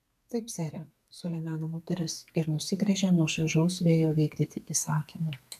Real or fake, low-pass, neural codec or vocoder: fake; 14.4 kHz; codec, 32 kHz, 1.9 kbps, SNAC